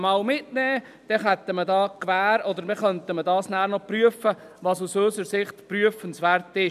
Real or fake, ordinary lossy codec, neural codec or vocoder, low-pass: real; none; none; 14.4 kHz